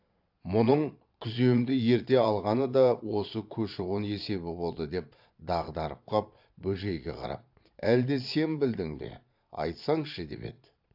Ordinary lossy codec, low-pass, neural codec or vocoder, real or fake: AAC, 48 kbps; 5.4 kHz; vocoder, 22.05 kHz, 80 mel bands, Vocos; fake